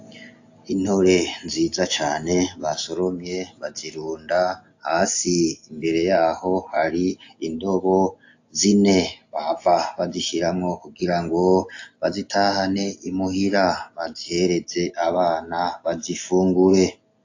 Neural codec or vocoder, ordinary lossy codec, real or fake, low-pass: none; AAC, 48 kbps; real; 7.2 kHz